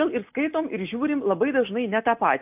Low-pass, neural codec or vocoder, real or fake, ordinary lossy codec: 3.6 kHz; none; real; AAC, 32 kbps